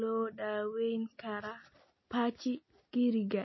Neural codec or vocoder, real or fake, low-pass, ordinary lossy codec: none; real; 7.2 kHz; MP3, 24 kbps